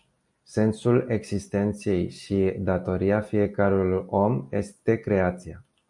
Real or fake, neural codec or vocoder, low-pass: real; none; 10.8 kHz